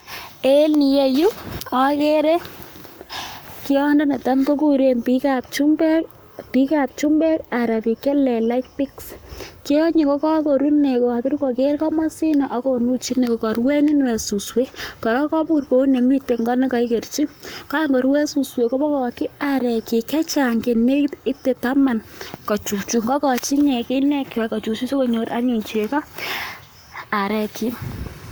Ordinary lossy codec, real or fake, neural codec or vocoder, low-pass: none; fake; codec, 44.1 kHz, 7.8 kbps, Pupu-Codec; none